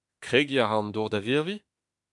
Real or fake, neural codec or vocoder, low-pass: fake; autoencoder, 48 kHz, 32 numbers a frame, DAC-VAE, trained on Japanese speech; 10.8 kHz